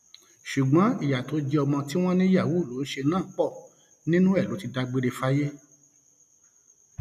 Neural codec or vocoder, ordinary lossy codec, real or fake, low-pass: none; none; real; 14.4 kHz